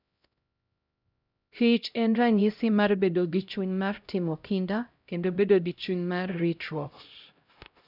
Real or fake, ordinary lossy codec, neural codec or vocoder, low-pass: fake; none; codec, 16 kHz, 0.5 kbps, X-Codec, HuBERT features, trained on LibriSpeech; 5.4 kHz